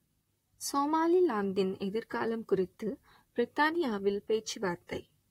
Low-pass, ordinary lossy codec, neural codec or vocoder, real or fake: 19.8 kHz; AAC, 48 kbps; vocoder, 44.1 kHz, 128 mel bands, Pupu-Vocoder; fake